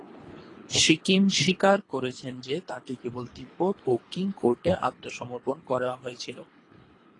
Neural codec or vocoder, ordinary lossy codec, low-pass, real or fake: codec, 24 kHz, 3 kbps, HILCodec; AAC, 32 kbps; 10.8 kHz; fake